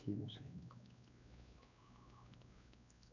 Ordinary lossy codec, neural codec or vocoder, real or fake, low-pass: none; codec, 16 kHz, 2 kbps, X-Codec, WavLM features, trained on Multilingual LibriSpeech; fake; 7.2 kHz